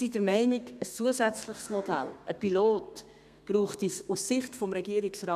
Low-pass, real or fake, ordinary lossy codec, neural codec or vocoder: 14.4 kHz; fake; none; codec, 32 kHz, 1.9 kbps, SNAC